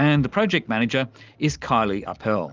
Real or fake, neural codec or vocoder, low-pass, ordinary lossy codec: real; none; 7.2 kHz; Opus, 32 kbps